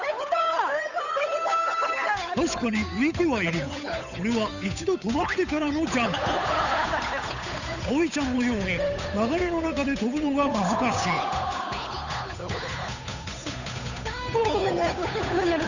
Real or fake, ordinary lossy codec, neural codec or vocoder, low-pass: fake; none; codec, 16 kHz, 8 kbps, FunCodec, trained on Chinese and English, 25 frames a second; 7.2 kHz